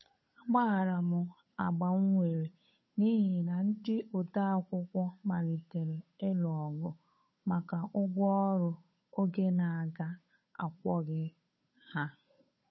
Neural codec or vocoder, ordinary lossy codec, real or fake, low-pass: codec, 16 kHz, 8 kbps, FunCodec, trained on Chinese and English, 25 frames a second; MP3, 24 kbps; fake; 7.2 kHz